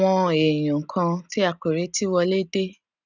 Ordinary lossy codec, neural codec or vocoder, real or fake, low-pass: none; none; real; 7.2 kHz